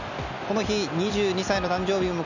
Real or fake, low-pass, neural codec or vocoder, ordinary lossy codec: real; 7.2 kHz; none; none